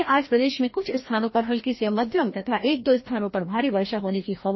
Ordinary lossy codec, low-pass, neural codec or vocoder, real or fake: MP3, 24 kbps; 7.2 kHz; codec, 16 kHz, 1 kbps, FreqCodec, larger model; fake